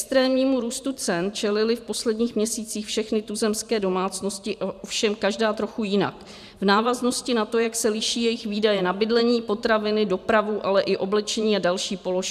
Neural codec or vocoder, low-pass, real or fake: vocoder, 44.1 kHz, 128 mel bands every 512 samples, BigVGAN v2; 14.4 kHz; fake